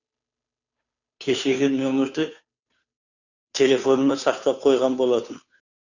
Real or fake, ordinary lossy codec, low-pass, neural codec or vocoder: fake; none; 7.2 kHz; codec, 16 kHz, 2 kbps, FunCodec, trained on Chinese and English, 25 frames a second